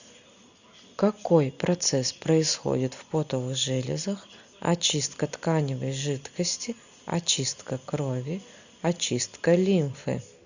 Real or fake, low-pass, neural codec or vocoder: real; 7.2 kHz; none